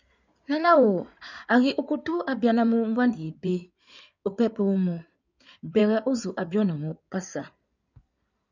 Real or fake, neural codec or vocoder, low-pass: fake; codec, 16 kHz in and 24 kHz out, 2.2 kbps, FireRedTTS-2 codec; 7.2 kHz